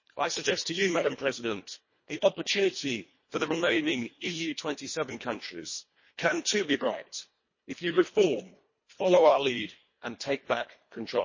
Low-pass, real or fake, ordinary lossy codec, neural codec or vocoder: 7.2 kHz; fake; MP3, 32 kbps; codec, 24 kHz, 1.5 kbps, HILCodec